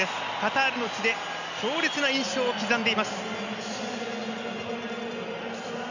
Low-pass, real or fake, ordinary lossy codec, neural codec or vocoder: 7.2 kHz; real; none; none